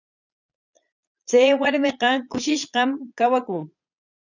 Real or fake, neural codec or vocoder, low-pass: fake; vocoder, 22.05 kHz, 80 mel bands, Vocos; 7.2 kHz